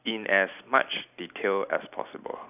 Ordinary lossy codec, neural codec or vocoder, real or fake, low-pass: none; none; real; 3.6 kHz